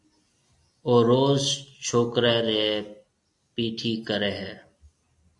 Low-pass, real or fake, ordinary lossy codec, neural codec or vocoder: 10.8 kHz; real; AAC, 64 kbps; none